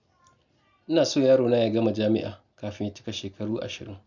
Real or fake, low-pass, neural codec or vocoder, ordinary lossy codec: real; 7.2 kHz; none; none